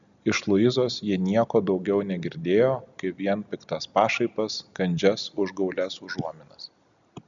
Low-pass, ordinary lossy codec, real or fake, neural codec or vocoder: 7.2 kHz; MP3, 96 kbps; real; none